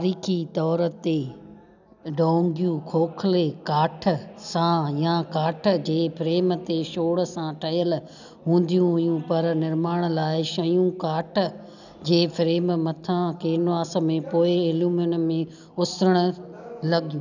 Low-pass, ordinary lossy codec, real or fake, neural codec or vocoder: 7.2 kHz; none; real; none